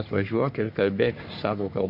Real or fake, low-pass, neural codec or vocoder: fake; 5.4 kHz; codec, 44.1 kHz, 3.4 kbps, Pupu-Codec